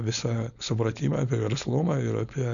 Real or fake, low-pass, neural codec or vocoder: fake; 7.2 kHz; codec, 16 kHz, 4.8 kbps, FACodec